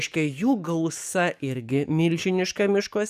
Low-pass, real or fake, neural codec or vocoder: 14.4 kHz; fake; codec, 44.1 kHz, 7.8 kbps, DAC